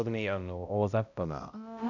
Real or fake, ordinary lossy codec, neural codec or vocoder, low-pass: fake; none; codec, 16 kHz, 0.5 kbps, X-Codec, HuBERT features, trained on balanced general audio; 7.2 kHz